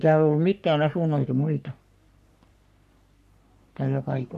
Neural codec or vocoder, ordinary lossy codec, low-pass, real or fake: codec, 44.1 kHz, 3.4 kbps, Pupu-Codec; none; 14.4 kHz; fake